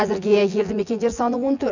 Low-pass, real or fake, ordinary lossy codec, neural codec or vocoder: 7.2 kHz; fake; none; vocoder, 24 kHz, 100 mel bands, Vocos